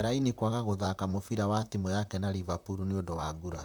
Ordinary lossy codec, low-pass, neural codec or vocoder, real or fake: none; none; vocoder, 44.1 kHz, 128 mel bands, Pupu-Vocoder; fake